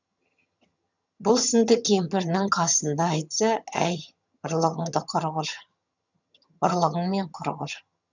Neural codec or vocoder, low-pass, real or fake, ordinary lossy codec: vocoder, 22.05 kHz, 80 mel bands, HiFi-GAN; 7.2 kHz; fake; none